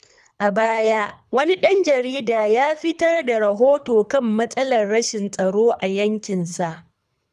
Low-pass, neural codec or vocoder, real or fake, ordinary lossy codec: none; codec, 24 kHz, 3 kbps, HILCodec; fake; none